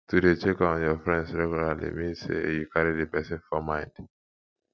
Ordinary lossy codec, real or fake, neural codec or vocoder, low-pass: none; real; none; none